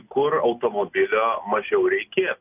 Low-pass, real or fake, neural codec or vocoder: 3.6 kHz; real; none